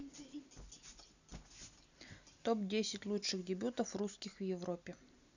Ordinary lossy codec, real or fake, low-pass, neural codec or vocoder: none; real; 7.2 kHz; none